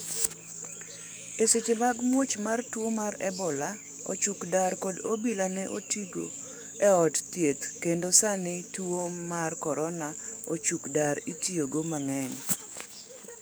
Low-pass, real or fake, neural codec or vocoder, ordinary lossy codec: none; fake; codec, 44.1 kHz, 7.8 kbps, DAC; none